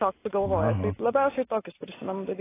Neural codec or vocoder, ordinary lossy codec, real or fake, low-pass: none; AAC, 16 kbps; real; 3.6 kHz